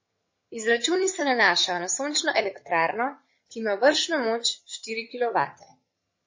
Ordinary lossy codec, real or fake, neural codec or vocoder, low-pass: MP3, 32 kbps; fake; vocoder, 22.05 kHz, 80 mel bands, HiFi-GAN; 7.2 kHz